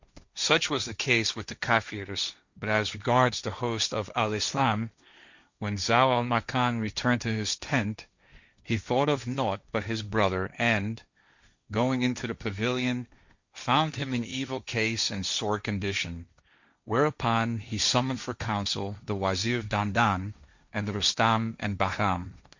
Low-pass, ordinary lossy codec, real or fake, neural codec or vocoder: 7.2 kHz; Opus, 64 kbps; fake; codec, 16 kHz, 1.1 kbps, Voila-Tokenizer